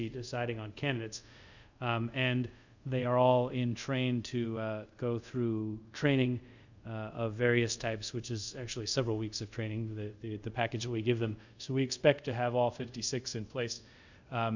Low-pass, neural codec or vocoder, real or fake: 7.2 kHz; codec, 24 kHz, 0.5 kbps, DualCodec; fake